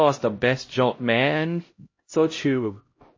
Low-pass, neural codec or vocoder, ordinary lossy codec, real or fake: 7.2 kHz; codec, 16 kHz, 0.5 kbps, X-Codec, HuBERT features, trained on LibriSpeech; MP3, 32 kbps; fake